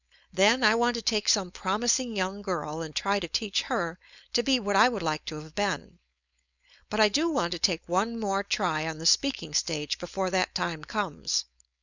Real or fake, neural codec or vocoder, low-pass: fake; codec, 16 kHz, 4.8 kbps, FACodec; 7.2 kHz